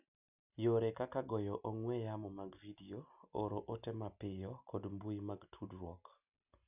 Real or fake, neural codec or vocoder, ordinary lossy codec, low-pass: real; none; none; 3.6 kHz